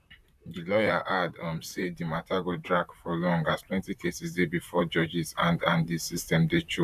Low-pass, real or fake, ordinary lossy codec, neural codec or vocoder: 14.4 kHz; fake; none; vocoder, 44.1 kHz, 128 mel bands, Pupu-Vocoder